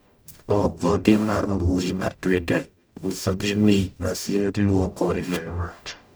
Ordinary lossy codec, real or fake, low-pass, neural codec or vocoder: none; fake; none; codec, 44.1 kHz, 0.9 kbps, DAC